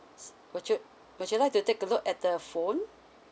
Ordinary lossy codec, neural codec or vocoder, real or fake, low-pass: none; none; real; none